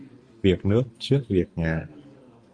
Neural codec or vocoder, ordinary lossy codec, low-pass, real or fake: none; Opus, 32 kbps; 9.9 kHz; real